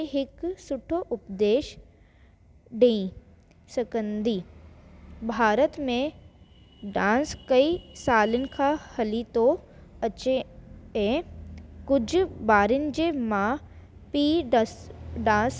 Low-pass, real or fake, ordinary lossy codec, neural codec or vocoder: none; real; none; none